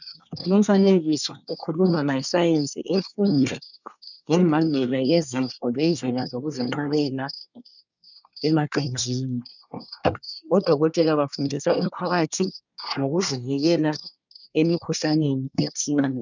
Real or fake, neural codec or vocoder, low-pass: fake; codec, 24 kHz, 1 kbps, SNAC; 7.2 kHz